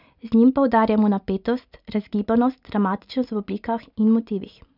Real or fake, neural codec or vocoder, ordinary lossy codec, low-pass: real; none; none; 5.4 kHz